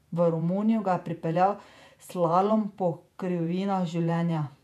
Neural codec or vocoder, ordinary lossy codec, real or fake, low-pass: vocoder, 48 kHz, 128 mel bands, Vocos; none; fake; 14.4 kHz